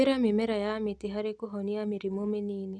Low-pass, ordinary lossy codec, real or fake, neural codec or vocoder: none; none; real; none